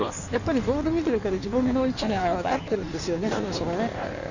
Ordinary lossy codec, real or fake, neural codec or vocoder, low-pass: none; fake; codec, 16 kHz in and 24 kHz out, 1.1 kbps, FireRedTTS-2 codec; 7.2 kHz